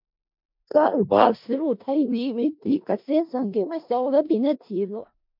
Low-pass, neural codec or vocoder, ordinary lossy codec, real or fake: 5.4 kHz; codec, 16 kHz in and 24 kHz out, 0.4 kbps, LongCat-Audio-Codec, four codebook decoder; MP3, 48 kbps; fake